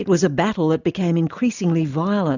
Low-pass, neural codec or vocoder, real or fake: 7.2 kHz; none; real